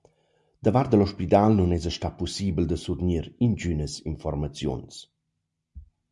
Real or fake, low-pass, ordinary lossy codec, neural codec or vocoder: real; 10.8 kHz; MP3, 64 kbps; none